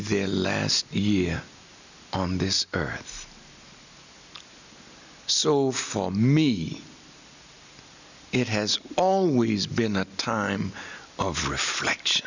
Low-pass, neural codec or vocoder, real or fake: 7.2 kHz; none; real